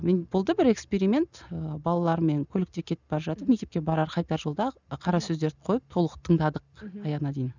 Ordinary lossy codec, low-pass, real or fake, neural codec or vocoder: none; 7.2 kHz; real; none